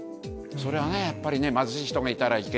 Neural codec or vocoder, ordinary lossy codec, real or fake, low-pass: none; none; real; none